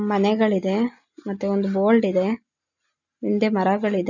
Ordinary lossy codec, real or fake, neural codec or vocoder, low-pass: none; real; none; 7.2 kHz